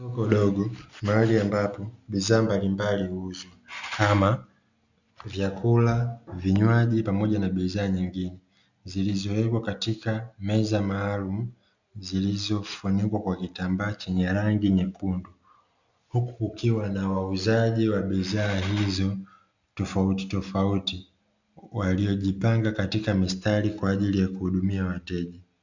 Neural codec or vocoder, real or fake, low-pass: none; real; 7.2 kHz